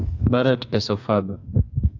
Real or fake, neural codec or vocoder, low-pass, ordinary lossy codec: fake; codec, 32 kHz, 1.9 kbps, SNAC; 7.2 kHz; AAC, 48 kbps